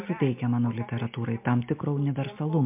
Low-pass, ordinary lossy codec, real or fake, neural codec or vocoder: 3.6 kHz; MP3, 32 kbps; real; none